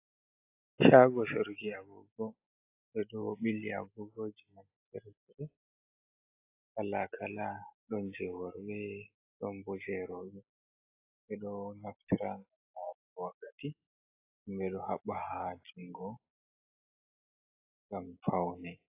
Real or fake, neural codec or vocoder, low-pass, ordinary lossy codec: real; none; 3.6 kHz; AAC, 24 kbps